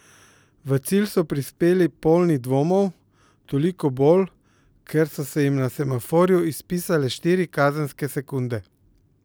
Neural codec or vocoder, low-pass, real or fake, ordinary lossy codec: none; none; real; none